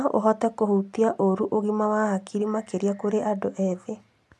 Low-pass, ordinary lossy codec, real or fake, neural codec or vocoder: none; none; real; none